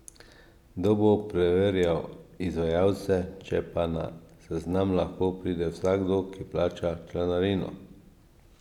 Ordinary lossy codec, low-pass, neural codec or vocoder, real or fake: none; 19.8 kHz; none; real